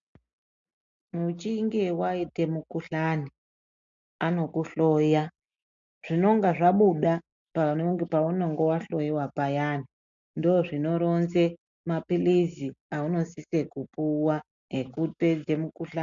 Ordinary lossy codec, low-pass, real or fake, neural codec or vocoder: MP3, 64 kbps; 7.2 kHz; real; none